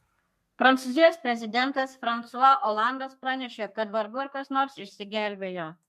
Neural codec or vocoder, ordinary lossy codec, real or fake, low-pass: codec, 32 kHz, 1.9 kbps, SNAC; MP3, 64 kbps; fake; 14.4 kHz